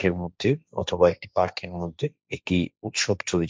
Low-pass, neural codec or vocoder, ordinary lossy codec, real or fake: none; codec, 16 kHz, 1.1 kbps, Voila-Tokenizer; none; fake